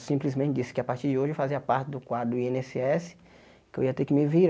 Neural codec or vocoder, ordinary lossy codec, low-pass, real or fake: none; none; none; real